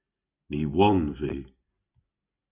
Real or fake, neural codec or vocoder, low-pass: real; none; 3.6 kHz